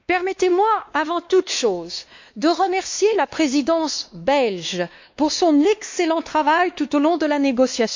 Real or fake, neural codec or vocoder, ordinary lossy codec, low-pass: fake; codec, 16 kHz, 2 kbps, X-Codec, WavLM features, trained on Multilingual LibriSpeech; MP3, 64 kbps; 7.2 kHz